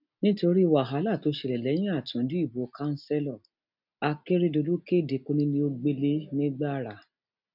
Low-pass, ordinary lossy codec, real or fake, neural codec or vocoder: 5.4 kHz; none; real; none